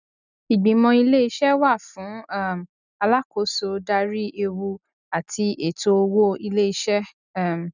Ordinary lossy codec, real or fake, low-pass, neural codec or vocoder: none; real; 7.2 kHz; none